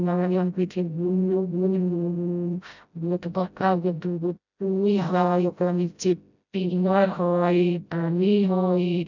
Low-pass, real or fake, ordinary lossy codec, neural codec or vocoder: 7.2 kHz; fake; none; codec, 16 kHz, 0.5 kbps, FreqCodec, smaller model